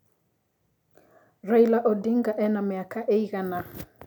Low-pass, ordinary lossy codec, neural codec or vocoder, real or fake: 19.8 kHz; none; none; real